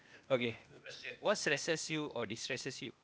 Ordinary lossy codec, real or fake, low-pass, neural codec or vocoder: none; fake; none; codec, 16 kHz, 0.8 kbps, ZipCodec